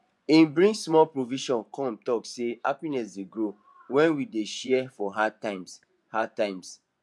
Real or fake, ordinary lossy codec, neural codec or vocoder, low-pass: fake; none; vocoder, 24 kHz, 100 mel bands, Vocos; none